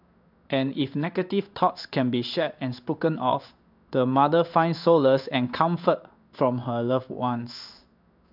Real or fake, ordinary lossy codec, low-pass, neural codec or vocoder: fake; none; 5.4 kHz; codec, 16 kHz in and 24 kHz out, 1 kbps, XY-Tokenizer